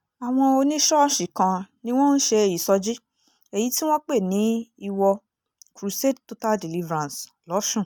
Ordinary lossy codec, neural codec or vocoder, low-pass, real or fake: none; none; 19.8 kHz; real